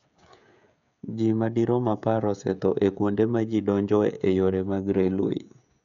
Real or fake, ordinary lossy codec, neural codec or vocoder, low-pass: fake; MP3, 96 kbps; codec, 16 kHz, 16 kbps, FreqCodec, smaller model; 7.2 kHz